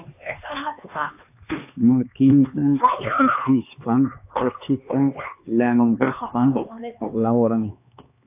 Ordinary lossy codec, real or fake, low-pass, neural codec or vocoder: AAC, 32 kbps; fake; 3.6 kHz; codec, 16 kHz, 2 kbps, X-Codec, HuBERT features, trained on LibriSpeech